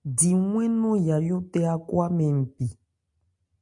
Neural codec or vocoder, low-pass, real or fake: none; 10.8 kHz; real